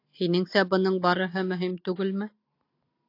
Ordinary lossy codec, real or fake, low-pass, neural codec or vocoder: AAC, 32 kbps; real; 5.4 kHz; none